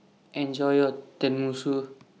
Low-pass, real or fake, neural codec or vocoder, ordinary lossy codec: none; real; none; none